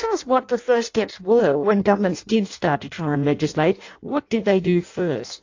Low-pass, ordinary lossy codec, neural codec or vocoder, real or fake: 7.2 kHz; AAC, 48 kbps; codec, 16 kHz in and 24 kHz out, 0.6 kbps, FireRedTTS-2 codec; fake